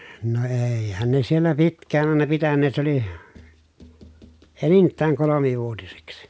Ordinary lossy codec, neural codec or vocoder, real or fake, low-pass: none; none; real; none